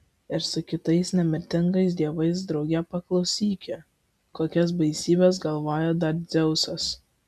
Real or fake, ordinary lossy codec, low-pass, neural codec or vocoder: real; MP3, 96 kbps; 14.4 kHz; none